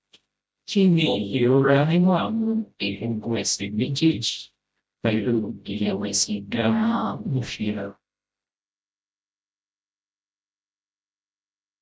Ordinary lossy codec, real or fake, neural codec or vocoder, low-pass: none; fake; codec, 16 kHz, 0.5 kbps, FreqCodec, smaller model; none